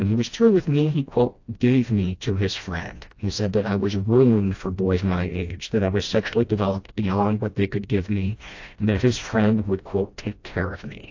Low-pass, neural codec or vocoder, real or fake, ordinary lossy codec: 7.2 kHz; codec, 16 kHz, 1 kbps, FreqCodec, smaller model; fake; AAC, 48 kbps